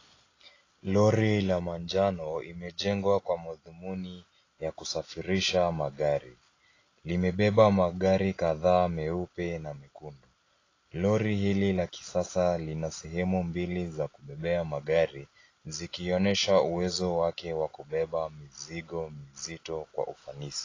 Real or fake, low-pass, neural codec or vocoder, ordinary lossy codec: real; 7.2 kHz; none; AAC, 32 kbps